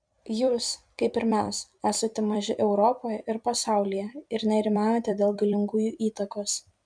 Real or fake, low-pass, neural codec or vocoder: fake; 9.9 kHz; vocoder, 44.1 kHz, 128 mel bands every 256 samples, BigVGAN v2